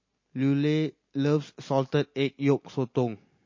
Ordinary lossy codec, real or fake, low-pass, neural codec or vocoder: MP3, 32 kbps; real; 7.2 kHz; none